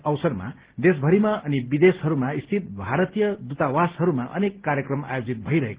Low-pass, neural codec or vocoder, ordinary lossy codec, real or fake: 3.6 kHz; none; Opus, 16 kbps; real